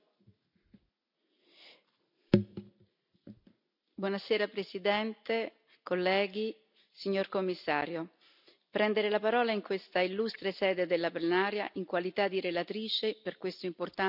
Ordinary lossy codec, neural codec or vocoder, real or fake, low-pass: none; none; real; 5.4 kHz